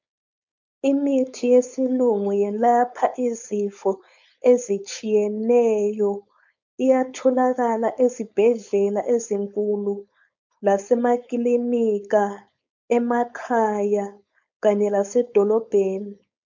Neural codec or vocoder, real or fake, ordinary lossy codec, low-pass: codec, 16 kHz, 4.8 kbps, FACodec; fake; MP3, 64 kbps; 7.2 kHz